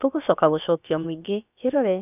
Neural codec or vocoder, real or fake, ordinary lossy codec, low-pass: codec, 16 kHz, about 1 kbps, DyCAST, with the encoder's durations; fake; none; 3.6 kHz